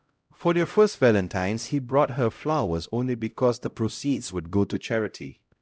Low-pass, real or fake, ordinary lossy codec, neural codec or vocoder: none; fake; none; codec, 16 kHz, 0.5 kbps, X-Codec, HuBERT features, trained on LibriSpeech